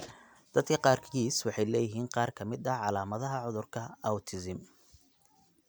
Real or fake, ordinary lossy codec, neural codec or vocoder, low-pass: real; none; none; none